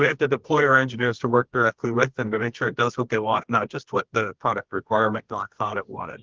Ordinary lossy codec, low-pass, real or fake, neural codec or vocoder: Opus, 16 kbps; 7.2 kHz; fake; codec, 24 kHz, 0.9 kbps, WavTokenizer, medium music audio release